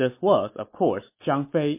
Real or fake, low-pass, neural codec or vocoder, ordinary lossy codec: real; 3.6 kHz; none; MP3, 24 kbps